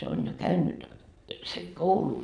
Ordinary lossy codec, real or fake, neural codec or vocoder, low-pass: none; fake; vocoder, 22.05 kHz, 80 mel bands, Vocos; 9.9 kHz